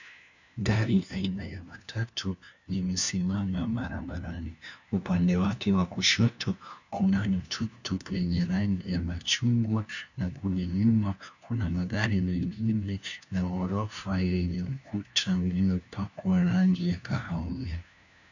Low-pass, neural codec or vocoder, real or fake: 7.2 kHz; codec, 16 kHz, 1 kbps, FunCodec, trained on LibriTTS, 50 frames a second; fake